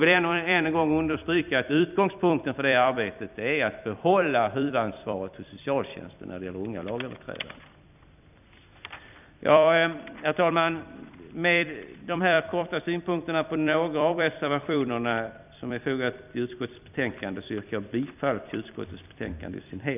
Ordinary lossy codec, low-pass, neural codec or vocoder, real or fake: none; 3.6 kHz; vocoder, 44.1 kHz, 128 mel bands every 256 samples, BigVGAN v2; fake